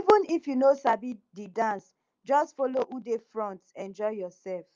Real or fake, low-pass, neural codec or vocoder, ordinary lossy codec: real; 7.2 kHz; none; Opus, 24 kbps